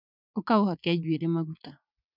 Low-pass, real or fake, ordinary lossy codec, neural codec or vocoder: 5.4 kHz; fake; none; vocoder, 44.1 kHz, 80 mel bands, Vocos